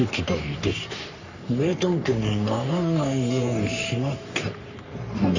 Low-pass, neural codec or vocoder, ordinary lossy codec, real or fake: 7.2 kHz; codec, 44.1 kHz, 3.4 kbps, Pupu-Codec; Opus, 64 kbps; fake